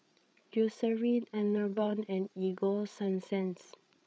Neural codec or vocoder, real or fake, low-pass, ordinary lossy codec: codec, 16 kHz, 8 kbps, FreqCodec, larger model; fake; none; none